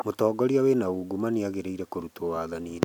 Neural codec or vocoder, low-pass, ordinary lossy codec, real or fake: none; 19.8 kHz; none; real